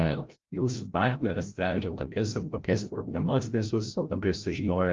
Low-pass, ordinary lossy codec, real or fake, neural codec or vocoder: 7.2 kHz; Opus, 32 kbps; fake; codec, 16 kHz, 0.5 kbps, FreqCodec, larger model